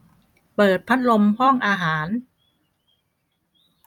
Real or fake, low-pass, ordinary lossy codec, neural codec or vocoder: fake; 19.8 kHz; none; vocoder, 44.1 kHz, 128 mel bands every 256 samples, BigVGAN v2